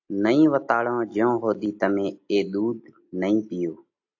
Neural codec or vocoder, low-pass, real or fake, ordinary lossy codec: none; 7.2 kHz; real; AAC, 48 kbps